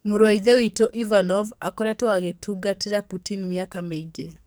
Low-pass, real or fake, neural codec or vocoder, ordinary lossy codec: none; fake; codec, 44.1 kHz, 2.6 kbps, SNAC; none